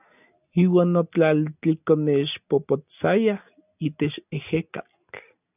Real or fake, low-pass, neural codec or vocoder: real; 3.6 kHz; none